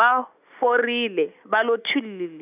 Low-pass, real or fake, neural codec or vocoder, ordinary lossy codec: 3.6 kHz; real; none; none